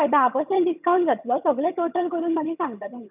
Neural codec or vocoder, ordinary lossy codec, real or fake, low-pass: vocoder, 22.05 kHz, 80 mel bands, HiFi-GAN; none; fake; 3.6 kHz